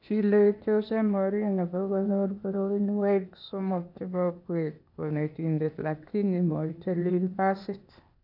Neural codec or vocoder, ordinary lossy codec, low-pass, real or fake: codec, 16 kHz, 0.8 kbps, ZipCodec; none; 5.4 kHz; fake